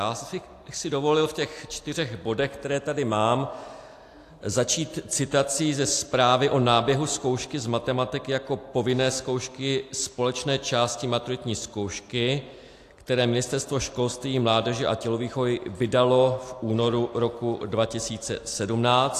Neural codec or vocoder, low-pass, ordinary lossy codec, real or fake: none; 14.4 kHz; AAC, 64 kbps; real